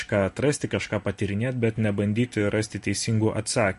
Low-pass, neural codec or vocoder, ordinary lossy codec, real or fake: 14.4 kHz; none; MP3, 48 kbps; real